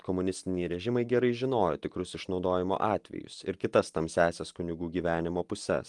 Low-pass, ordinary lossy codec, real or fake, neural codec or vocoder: 10.8 kHz; Opus, 24 kbps; real; none